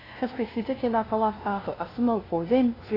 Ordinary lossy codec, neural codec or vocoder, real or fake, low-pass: AAC, 24 kbps; codec, 16 kHz, 0.5 kbps, FunCodec, trained on LibriTTS, 25 frames a second; fake; 5.4 kHz